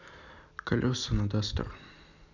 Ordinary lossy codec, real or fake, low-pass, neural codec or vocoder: none; real; 7.2 kHz; none